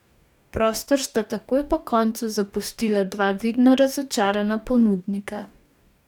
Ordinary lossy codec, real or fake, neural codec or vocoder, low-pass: none; fake; codec, 44.1 kHz, 2.6 kbps, DAC; 19.8 kHz